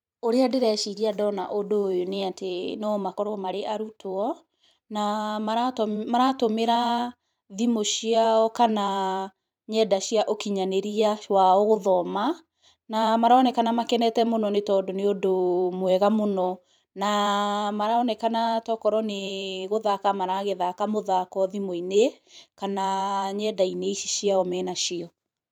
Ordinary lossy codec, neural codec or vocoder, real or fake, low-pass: none; vocoder, 44.1 kHz, 128 mel bands every 512 samples, BigVGAN v2; fake; 19.8 kHz